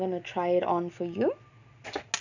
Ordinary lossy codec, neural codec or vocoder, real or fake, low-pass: none; none; real; 7.2 kHz